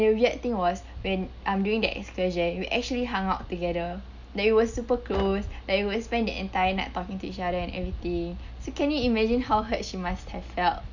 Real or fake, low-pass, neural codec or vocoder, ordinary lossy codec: real; 7.2 kHz; none; none